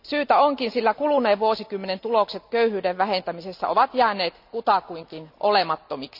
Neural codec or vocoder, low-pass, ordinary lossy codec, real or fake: none; 5.4 kHz; none; real